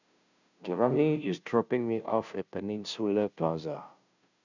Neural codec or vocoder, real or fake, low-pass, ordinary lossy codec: codec, 16 kHz, 0.5 kbps, FunCodec, trained on Chinese and English, 25 frames a second; fake; 7.2 kHz; none